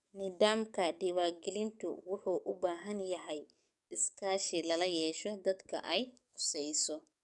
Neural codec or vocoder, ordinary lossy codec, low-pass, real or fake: codec, 44.1 kHz, 7.8 kbps, DAC; none; 10.8 kHz; fake